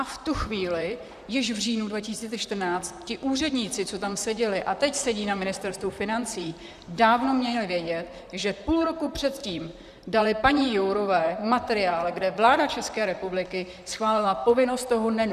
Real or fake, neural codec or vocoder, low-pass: fake; vocoder, 44.1 kHz, 128 mel bands, Pupu-Vocoder; 14.4 kHz